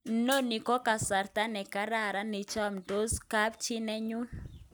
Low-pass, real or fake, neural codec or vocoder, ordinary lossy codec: none; real; none; none